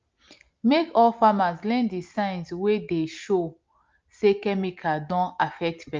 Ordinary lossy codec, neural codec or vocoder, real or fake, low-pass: Opus, 24 kbps; none; real; 7.2 kHz